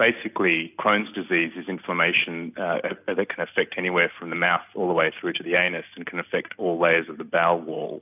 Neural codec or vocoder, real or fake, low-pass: none; real; 3.6 kHz